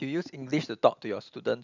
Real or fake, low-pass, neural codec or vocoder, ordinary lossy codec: real; 7.2 kHz; none; none